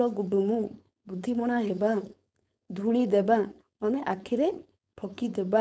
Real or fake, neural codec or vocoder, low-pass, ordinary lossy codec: fake; codec, 16 kHz, 4.8 kbps, FACodec; none; none